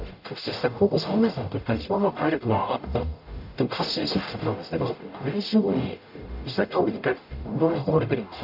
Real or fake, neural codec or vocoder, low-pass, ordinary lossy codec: fake; codec, 44.1 kHz, 0.9 kbps, DAC; 5.4 kHz; AAC, 48 kbps